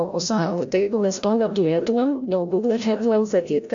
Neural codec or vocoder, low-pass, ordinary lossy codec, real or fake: codec, 16 kHz, 0.5 kbps, FreqCodec, larger model; 7.2 kHz; AAC, 64 kbps; fake